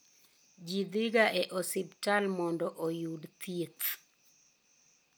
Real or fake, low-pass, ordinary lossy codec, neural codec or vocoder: real; none; none; none